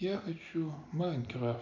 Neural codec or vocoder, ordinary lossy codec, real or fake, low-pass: none; none; real; 7.2 kHz